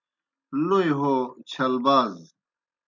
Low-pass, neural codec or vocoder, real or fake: 7.2 kHz; none; real